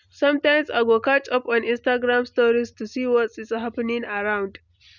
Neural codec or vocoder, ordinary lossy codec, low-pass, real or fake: none; none; 7.2 kHz; real